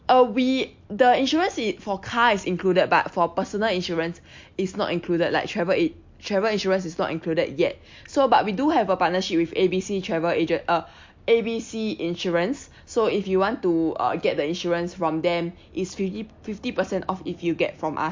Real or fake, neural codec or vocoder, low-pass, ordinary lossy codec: real; none; 7.2 kHz; MP3, 48 kbps